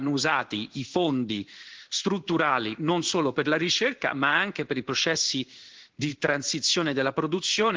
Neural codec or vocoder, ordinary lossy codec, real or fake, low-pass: codec, 16 kHz in and 24 kHz out, 1 kbps, XY-Tokenizer; Opus, 16 kbps; fake; 7.2 kHz